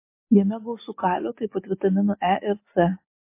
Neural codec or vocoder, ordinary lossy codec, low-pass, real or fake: none; MP3, 24 kbps; 3.6 kHz; real